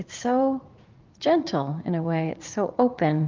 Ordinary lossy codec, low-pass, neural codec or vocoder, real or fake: Opus, 32 kbps; 7.2 kHz; vocoder, 44.1 kHz, 80 mel bands, Vocos; fake